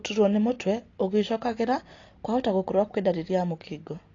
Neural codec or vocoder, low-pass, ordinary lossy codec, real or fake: none; 7.2 kHz; AAC, 32 kbps; real